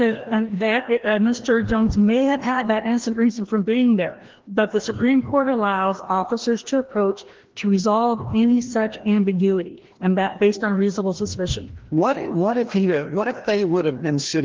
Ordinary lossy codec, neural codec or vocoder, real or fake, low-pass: Opus, 16 kbps; codec, 16 kHz, 1 kbps, FreqCodec, larger model; fake; 7.2 kHz